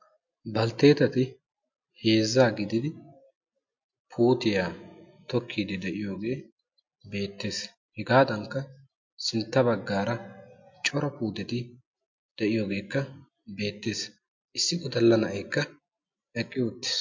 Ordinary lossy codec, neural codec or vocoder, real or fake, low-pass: MP3, 48 kbps; none; real; 7.2 kHz